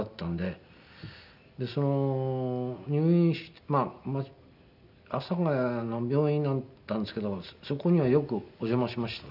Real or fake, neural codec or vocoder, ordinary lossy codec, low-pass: real; none; none; 5.4 kHz